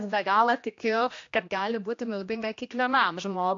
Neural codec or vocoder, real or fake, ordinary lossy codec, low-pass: codec, 16 kHz, 1 kbps, X-Codec, HuBERT features, trained on general audio; fake; AAC, 48 kbps; 7.2 kHz